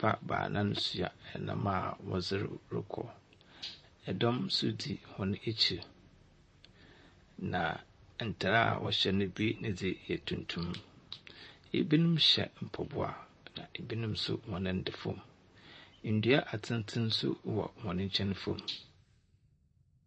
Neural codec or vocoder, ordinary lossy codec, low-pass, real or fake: vocoder, 44.1 kHz, 128 mel bands, Pupu-Vocoder; MP3, 32 kbps; 9.9 kHz; fake